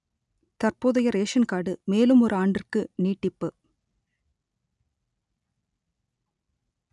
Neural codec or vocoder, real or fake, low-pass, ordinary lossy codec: none; real; 10.8 kHz; none